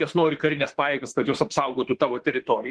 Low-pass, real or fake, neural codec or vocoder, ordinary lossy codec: 10.8 kHz; fake; autoencoder, 48 kHz, 32 numbers a frame, DAC-VAE, trained on Japanese speech; Opus, 16 kbps